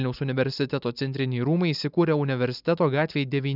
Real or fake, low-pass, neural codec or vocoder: real; 5.4 kHz; none